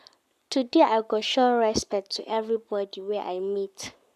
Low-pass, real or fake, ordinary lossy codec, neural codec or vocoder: 14.4 kHz; fake; none; codec, 44.1 kHz, 7.8 kbps, Pupu-Codec